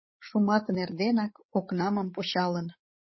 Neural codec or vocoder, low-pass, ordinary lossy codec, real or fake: codec, 16 kHz, 8 kbps, FreqCodec, larger model; 7.2 kHz; MP3, 24 kbps; fake